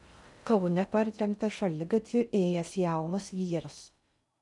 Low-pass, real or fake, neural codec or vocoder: 10.8 kHz; fake; codec, 16 kHz in and 24 kHz out, 0.6 kbps, FocalCodec, streaming, 2048 codes